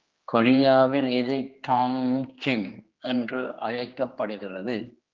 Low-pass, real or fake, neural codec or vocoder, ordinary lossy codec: 7.2 kHz; fake; codec, 16 kHz, 2 kbps, X-Codec, HuBERT features, trained on general audio; Opus, 32 kbps